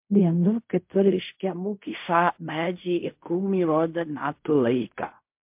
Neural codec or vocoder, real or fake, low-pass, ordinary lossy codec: codec, 16 kHz in and 24 kHz out, 0.4 kbps, LongCat-Audio-Codec, fine tuned four codebook decoder; fake; 3.6 kHz; MP3, 32 kbps